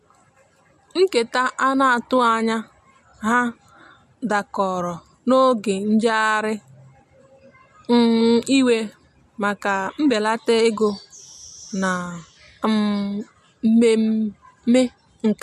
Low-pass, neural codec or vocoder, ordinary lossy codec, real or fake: 14.4 kHz; none; MP3, 64 kbps; real